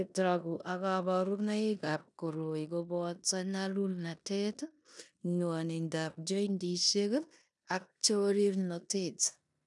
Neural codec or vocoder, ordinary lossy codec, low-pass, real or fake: codec, 16 kHz in and 24 kHz out, 0.9 kbps, LongCat-Audio-Codec, four codebook decoder; none; 10.8 kHz; fake